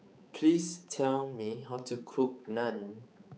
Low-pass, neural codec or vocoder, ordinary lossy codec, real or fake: none; codec, 16 kHz, 4 kbps, X-Codec, HuBERT features, trained on balanced general audio; none; fake